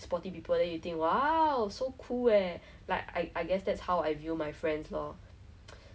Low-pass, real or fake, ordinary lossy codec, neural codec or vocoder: none; real; none; none